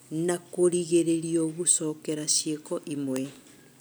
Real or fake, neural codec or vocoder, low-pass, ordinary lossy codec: real; none; none; none